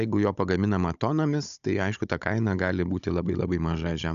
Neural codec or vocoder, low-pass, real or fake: codec, 16 kHz, 16 kbps, FunCodec, trained on Chinese and English, 50 frames a second; 7.2 kHz; fake